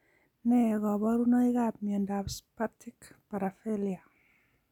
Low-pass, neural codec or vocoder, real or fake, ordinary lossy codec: 19.8 kHz; none; real; none